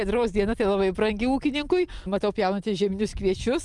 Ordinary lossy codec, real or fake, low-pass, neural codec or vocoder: Opus, 24 kbps; real; 10.8 kHz; none